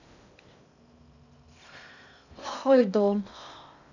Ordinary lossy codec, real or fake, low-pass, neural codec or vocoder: none; fake; 7.2 kHz; codec, 16 kHz in and 24 kHz out, 0.6 kbps, FocalCodec, streaming, 2048 codes